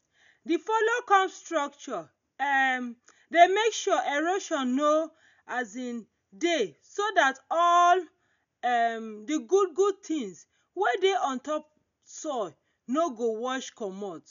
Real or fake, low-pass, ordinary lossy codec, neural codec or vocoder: real; 7.2 kHz; none; none